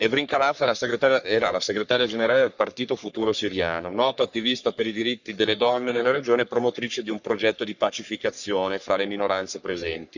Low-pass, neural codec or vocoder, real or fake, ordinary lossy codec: 7.2 kHz; codec, 44.1 kHz, 3.4 kbps, Pupu-Codec; fake; none